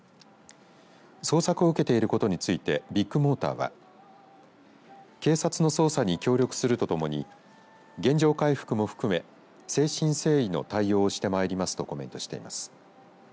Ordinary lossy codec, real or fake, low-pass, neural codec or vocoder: none; real; none; none